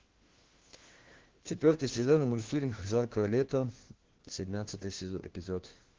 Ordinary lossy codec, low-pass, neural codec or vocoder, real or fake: Opus, 16 kbps; 7.2 kHz; codec, 16 kHz, 1 kbps, FunCodec, trained on LibriTTS, 50 frames a second; fake